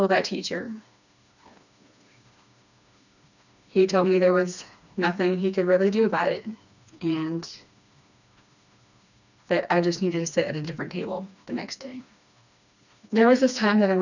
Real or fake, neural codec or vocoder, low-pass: fake; codec, 16 kHz, 2 kbps, FreqCodec, smaller model; 7.2 kHz